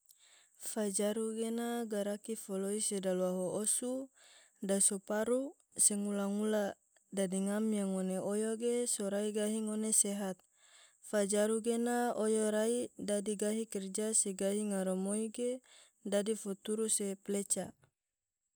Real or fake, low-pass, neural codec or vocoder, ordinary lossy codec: real; none; none; none